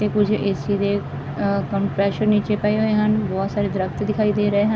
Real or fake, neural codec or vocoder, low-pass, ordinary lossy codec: real; none; none; none